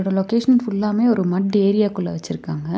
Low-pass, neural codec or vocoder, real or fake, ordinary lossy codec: none; none; real; none